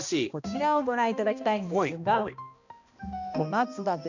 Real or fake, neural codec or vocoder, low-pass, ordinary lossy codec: fake; codec, 16 kHz, 1 kbps, X-Codec, HuBERT features, trained on balanced general audio; 7.2 kHz; none